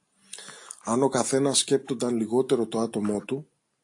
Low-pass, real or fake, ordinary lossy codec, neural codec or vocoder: 10.8 kHz; real; AAC, 64 kbps; none